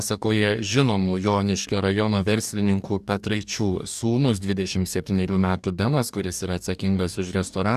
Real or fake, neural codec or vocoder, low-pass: fake; codec, 44.1 kHz, 2.6 kbps, DAC; 14.4 kHz